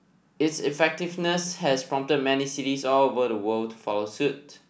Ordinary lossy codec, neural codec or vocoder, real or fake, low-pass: none; none; real; none